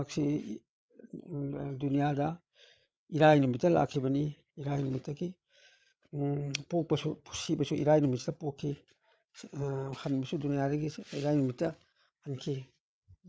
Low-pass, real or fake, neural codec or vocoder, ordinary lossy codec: none; fake; codec, 16 kHz, 8 kbps, FreqCodec, larger model; none